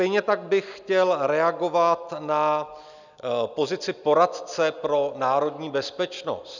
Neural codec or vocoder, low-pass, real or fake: none; 7.2 kHz; real